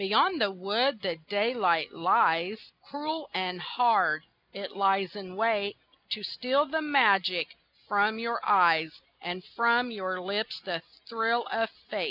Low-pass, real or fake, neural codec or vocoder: 5.4 kHz; real; none